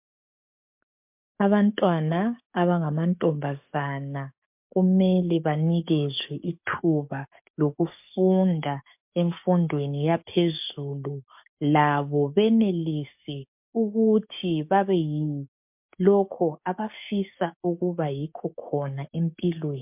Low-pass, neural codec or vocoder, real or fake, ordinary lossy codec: 3.6 kHz; codec, 44.1 kHz, 7.8 kbps, DAC; fake; MP3, 32 kbps